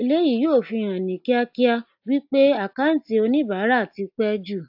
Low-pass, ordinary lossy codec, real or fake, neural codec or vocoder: 5.4 kHz; AAC, 48 kbps; real; none